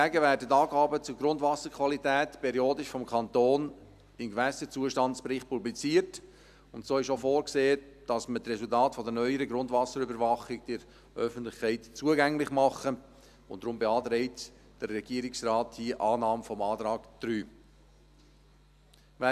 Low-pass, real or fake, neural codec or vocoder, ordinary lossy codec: 14.4 kHz; real; none; none